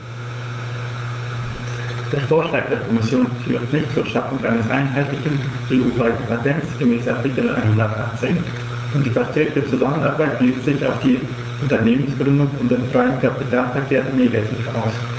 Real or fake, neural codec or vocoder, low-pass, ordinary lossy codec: fake; codec, 16 kHz, 8 kbps, FunCodec, trained on LibriTTS, 25 frames a second; none; none